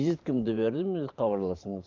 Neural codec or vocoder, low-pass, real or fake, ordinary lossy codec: vocoder, 44.1 kHz, 128 mel bands every 512 samples, BigVGAN v2; 7.2 kHz; fake; Opus, 24 kbps